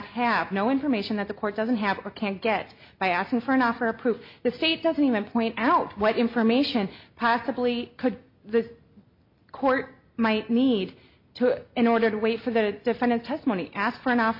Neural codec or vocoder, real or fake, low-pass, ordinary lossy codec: none; real; 5.4 kHz; MP3, 32 kbps